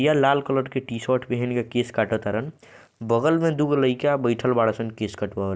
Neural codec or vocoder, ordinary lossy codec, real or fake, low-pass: none; none; real; none